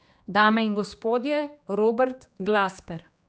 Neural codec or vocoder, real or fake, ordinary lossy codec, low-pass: codec, 16 kHz, 2 kbps, X-Codec, HuBERT features, trained on general audio; fake; none; none